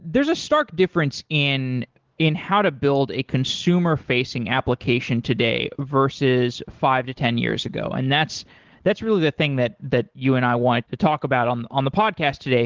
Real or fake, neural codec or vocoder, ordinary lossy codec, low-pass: real; none; Opus, 16 kbps; 7.2 kHz